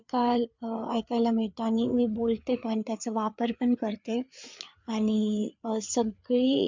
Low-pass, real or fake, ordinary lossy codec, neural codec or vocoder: 7.2 kHz; fake; MP3, 64 kbps; codec, 16 kHz, 4 kbps, FreqCodec, larger model